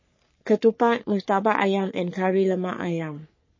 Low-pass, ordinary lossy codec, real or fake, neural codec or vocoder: 7.2 kHz; MP3, 32 kbps; fake; codec, 44.1 kHz, 3.4 kbps, Pupu-Codec